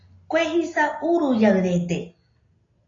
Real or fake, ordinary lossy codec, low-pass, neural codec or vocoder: real; AAC, 32 kbps; 7.2 kHz; none